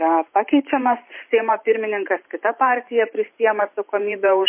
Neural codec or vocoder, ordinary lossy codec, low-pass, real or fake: vocoder, 44.1 kHz, 128 mel bands every 512 samples, BigVGAN v2; MP3, 24 kbps; 3.6 kHz; fake